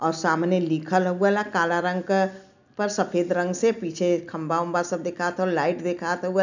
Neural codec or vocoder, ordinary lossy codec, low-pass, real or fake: none; none; 7.2 kHz; real